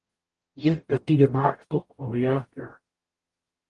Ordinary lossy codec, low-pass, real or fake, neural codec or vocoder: Opus, 24 kbps; 10.8 kHz; fake; codec, 44.1 kHz, 0.9 kbps, DAC